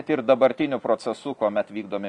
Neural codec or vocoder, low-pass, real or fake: none; 10.8 kHz; real